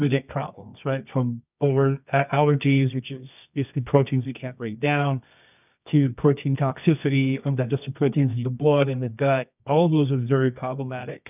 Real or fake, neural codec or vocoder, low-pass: fake; codec, 24 kHz, 0.9 kbps, WavTokenizer, medium music audio release; 3.6 kHz